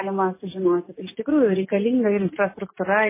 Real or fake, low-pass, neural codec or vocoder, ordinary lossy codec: real; 3.6 kHz; none; MP3, 16 kbps